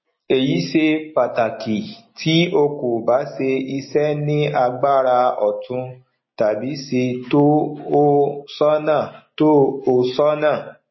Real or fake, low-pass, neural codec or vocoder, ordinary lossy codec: real; 7.2 kHz; none; MP3, 24 kbps